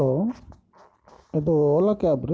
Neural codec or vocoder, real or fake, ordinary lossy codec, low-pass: none; real; none; none